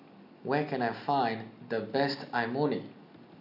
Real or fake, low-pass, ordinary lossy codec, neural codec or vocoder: real; 5.4 kHz; none; none